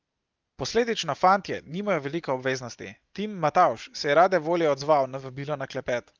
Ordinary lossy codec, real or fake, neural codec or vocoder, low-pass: Opus, 32 kbps; real; none; 7.2 kHz